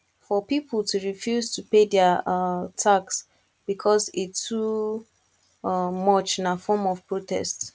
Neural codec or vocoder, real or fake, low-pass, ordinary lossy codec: none; real; none; none